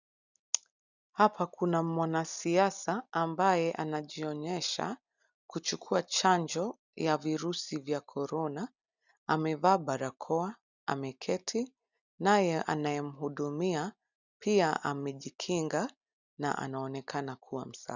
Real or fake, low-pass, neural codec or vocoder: real; 7.2 kHz; none